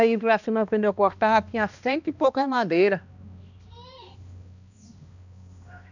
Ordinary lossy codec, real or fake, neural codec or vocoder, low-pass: none; fake; codec, 16 kHz, 1 kbps, X-Codec, HuBERT features, trained on balanced general audio; 7.2 kHz